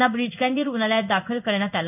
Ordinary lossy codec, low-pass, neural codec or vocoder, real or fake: none; 3.6 kHz; codec, 16 kHz in and 24 kHz out, 1 kbps, XY-Tokenizer; fake